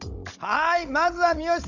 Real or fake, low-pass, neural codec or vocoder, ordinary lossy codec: fake; 7.2 kHz; codec, 16 kHz, 16 kbps, FunCodec, trained on Chinese and English, 50 frames a second; none